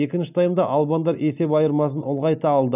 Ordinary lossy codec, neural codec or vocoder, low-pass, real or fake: none; none; 3.6 kHz; real